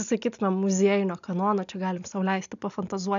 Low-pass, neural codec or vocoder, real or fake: 7.2 kHz; none; real